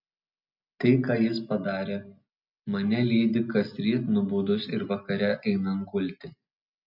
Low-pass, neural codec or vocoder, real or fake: 5.4 kHz; none; real